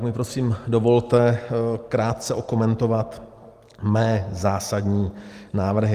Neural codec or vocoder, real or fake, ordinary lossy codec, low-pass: none; real; Opus, 32 kbps; 14.4 kHz